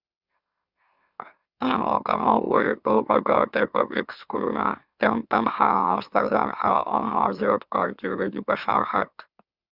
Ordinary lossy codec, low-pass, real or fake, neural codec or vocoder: Opus, 64 kbps; 5.4 kHz; fake; autoencoder, 44.1 kHz, a latent of 192 numbers a frame, MeloTTS